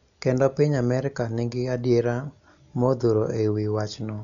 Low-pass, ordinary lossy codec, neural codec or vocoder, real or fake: 7.2 kHz; none; none; real